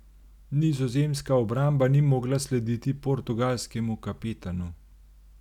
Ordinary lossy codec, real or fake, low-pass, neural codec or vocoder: none; real; 19.8 kHz; none